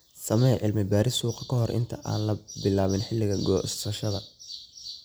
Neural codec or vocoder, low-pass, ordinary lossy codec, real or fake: none; none; none; real